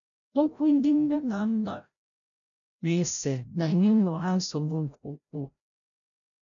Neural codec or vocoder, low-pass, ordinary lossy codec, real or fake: codec, 16 kHz, 0.5 kbps, FreqCodec, larger model; 7.2 kHz; none; fake